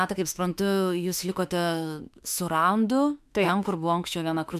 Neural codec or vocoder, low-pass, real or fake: autoencoder, 48 kHz, 32 numbers a frame, DAC-VAE, trained on Japanese speech; 14.4 kHz; fake